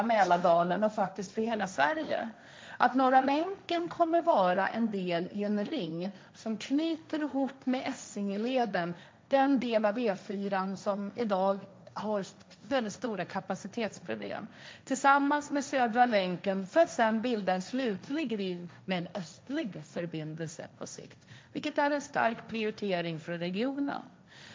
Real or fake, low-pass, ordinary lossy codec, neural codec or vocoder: fake; none; none; codec, 16 kHz, 1.1 kbps, Voila-Tokenizer